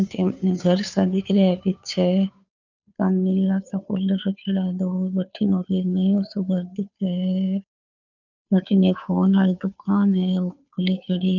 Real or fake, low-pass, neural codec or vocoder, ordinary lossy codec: fake; 7.2 kHz; codec, 16 kHz, 2 kbps, FunCodec, trained on Chinese and English, 25 frames a second; none